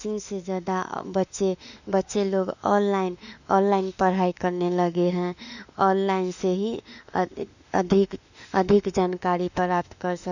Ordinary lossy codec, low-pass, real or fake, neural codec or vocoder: none; 7.2 kHz; fake; autoencoder, 48 kHz, 32 numbers a frame, DAC-VAE, trained on Japanese speech